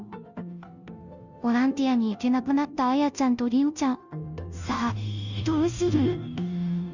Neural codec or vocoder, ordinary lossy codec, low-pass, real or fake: codec, 16 kHz, 0.5 kbps, FunCodec, trained on Chinese and English, 25 frames a second; none; 7.2 kHz; fake